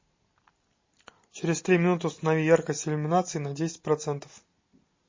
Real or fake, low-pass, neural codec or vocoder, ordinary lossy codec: real; 7.2 kHz; none; MP3, 32 kbps